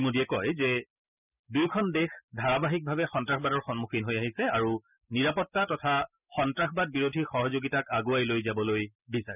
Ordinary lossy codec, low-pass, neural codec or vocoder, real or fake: none; 3.6 kHz; none; real